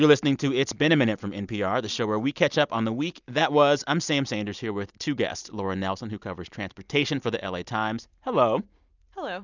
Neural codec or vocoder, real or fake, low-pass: none; real; 7.2 kHz